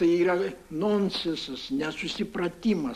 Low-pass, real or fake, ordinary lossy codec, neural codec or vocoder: 14.4 kHz; real; MP3, 64 kbps; none